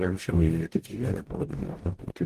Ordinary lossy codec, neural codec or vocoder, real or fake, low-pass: Opus, 16 kbps; codec, 44.1 kHz, 0.9 kbps, DAC; fake; 14.4 kHz